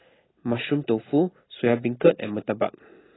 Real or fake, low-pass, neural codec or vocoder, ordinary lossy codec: real; 7.2 kHz; none; AAC, 16 kbps